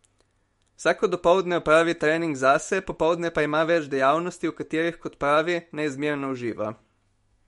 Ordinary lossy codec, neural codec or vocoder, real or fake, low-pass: MP3, 48 kbps; autoencoder, 48 kHz, 128 numbers a frame, DAC-VAE, trained on Japanese speech; fake; 19.8 kHz